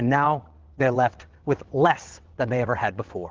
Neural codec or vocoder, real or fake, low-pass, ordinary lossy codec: vocoder, 22.05 kHz, 80 mel bands, WaveNeXt; fake; 7.2 kHz; Opus, 16 kbps